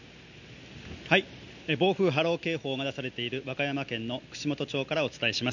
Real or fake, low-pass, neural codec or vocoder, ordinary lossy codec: real; 7.2 kHz; none; none